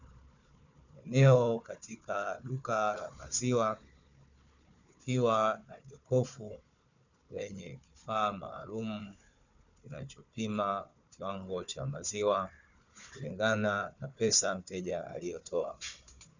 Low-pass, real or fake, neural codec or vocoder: 7.2 kHz; fake; codec, 16 kHz, 4 kbps, FunCodec, trained on Chinese and English, 50 frames a second